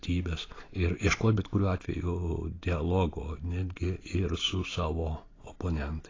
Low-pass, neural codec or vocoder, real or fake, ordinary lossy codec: 7.2 kHz; none; real; AAC, 32 kbps